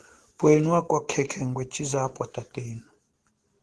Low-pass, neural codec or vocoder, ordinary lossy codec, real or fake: 10.8 kHz; none; Opus, 16 kbps; real